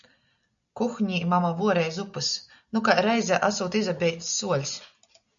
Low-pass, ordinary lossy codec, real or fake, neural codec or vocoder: 7.2 kHz; MP3, 96 kbps; real; none